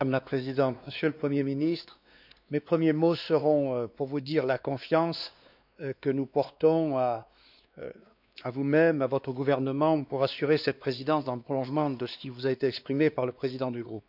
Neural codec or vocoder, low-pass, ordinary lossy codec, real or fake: codec, 16 kHz, 2 kbps, X-Codec, WavLM features, trained on Multilingual LibriSpeech; 5.4 kHz; none; fake